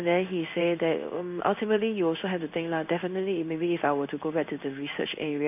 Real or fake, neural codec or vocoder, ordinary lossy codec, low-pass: fake; codec, 16 kHz in and 24 kHz out, 1 kbps, XY-Tokenizer; none; 3.6 kHz